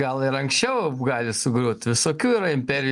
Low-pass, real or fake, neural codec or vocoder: 10.8 kHz; real; none